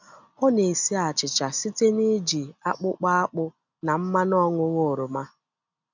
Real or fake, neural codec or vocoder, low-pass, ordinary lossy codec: real; none; 7.2 kHz; none